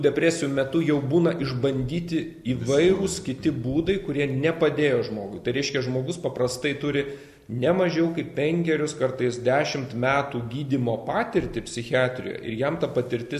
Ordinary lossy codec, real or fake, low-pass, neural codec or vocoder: MP3, 96 kbps; real; 14.4 kHz; none